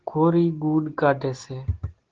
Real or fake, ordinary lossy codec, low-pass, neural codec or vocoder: real; Opus, 16 kbps; 7.2 kHz; none